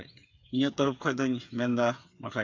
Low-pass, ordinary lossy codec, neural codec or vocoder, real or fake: 7.2 kHz; AAC, 48 kbps; codec, 16 kHz, 8 kbps, FreqCodec, smaller model; fake